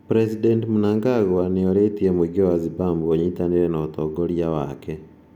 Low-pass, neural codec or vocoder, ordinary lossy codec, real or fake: 19.8 kHz; none; none; real